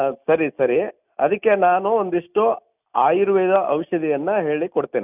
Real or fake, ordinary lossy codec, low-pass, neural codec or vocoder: real; none; 3.6 kHz; none